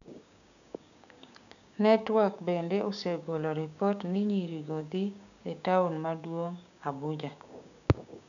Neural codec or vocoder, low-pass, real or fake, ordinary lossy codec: codec, 16 kHz, 6 kbps, DAC; 7.2 kHz; fake; none